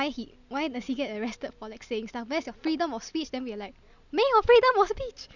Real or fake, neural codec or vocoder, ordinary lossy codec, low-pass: real; none; none; 7.2 kHz